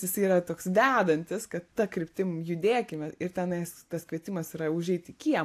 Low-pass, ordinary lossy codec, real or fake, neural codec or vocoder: 14.4 kHz; AAC, 64 kbps; real; none